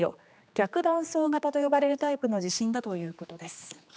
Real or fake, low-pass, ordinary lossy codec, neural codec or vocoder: fake; none; none; codec, 16 kHz, 2 kbps, X-Codec, HuBERT features, trained on general audio